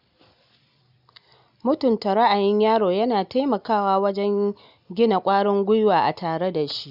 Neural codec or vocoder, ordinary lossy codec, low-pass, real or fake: none; none; 5.4 kHz; real